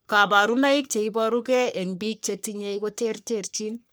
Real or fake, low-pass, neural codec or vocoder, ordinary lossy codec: fake; none; codec, 44.1 kHz, 3.4 kbps, Pupu-Codec; none